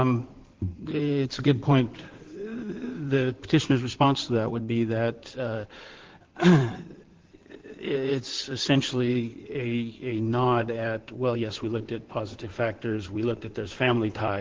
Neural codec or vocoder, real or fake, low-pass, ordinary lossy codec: codec, 16 kHz in and 24 kHz out, 2.2 kbps, FireRedTTS-2 codec; fake; 7.2 kHz; Opus, 16 kbps